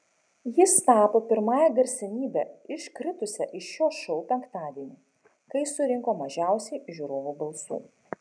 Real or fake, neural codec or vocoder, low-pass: real; none; 9.9 kHz